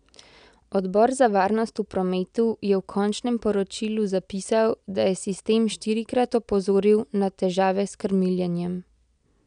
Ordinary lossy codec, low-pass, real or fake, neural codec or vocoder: none; 9.9 kHz; real; none